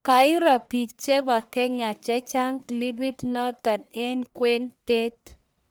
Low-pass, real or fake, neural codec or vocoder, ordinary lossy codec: none; fake; codec, 44.1 kHz, 1.7 kbps, Pupu-Codec; none